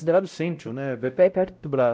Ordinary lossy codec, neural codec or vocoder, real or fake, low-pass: none; codec, 16 kHz, 0.5 kbps, X-Codec, WavLM features, trained on Multilingual LibriSpeech; fake; none